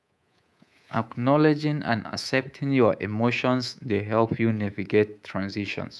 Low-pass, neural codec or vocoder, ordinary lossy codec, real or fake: none; codec, 24 kHz, 3.1 kbps, DualCodec; none; fake